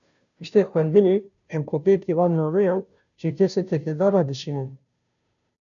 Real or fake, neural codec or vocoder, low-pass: fake; codec, 16 kHz, 0.5 kbps, FunCodec, trained on Chinese and English, 25 frames a second; 7.2 kHz